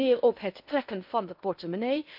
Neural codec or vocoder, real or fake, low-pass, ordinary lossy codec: codec, 16 kHz in and 24 kHz out, 0.8 kbps, FocalCodec, streaming, 65536 codes; fake; 5.4 kHz; none